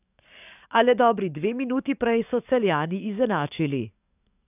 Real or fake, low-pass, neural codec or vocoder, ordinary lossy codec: fake; 3.6 kHz; codec, 44.1 kHz, 7.8 kbps, DAC; none